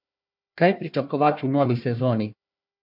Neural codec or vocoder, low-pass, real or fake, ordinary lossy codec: codec, 16 kHz, 1 kbps, FunCodec, trained on Chinese and English, 50 frames a second; 5.4 kHz; fake; MP3, 48 kbps